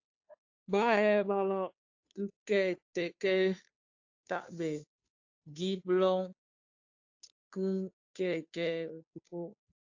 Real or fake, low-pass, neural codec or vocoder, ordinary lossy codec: fake; 7.2 kHz; codec, 16 kHz, 2 kbps, FunCodec, trained on Chinese and English, 25 frames a second; AAC, 48 kbps